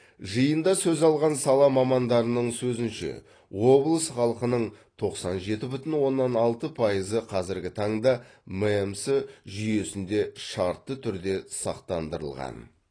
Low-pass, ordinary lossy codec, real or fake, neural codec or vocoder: 9.9 kHz; AAC, 32 kbps; real; none